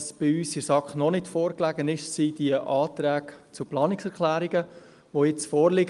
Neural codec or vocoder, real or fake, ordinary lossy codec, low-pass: none; real; Opus, 32 kbps; 10.8 kHz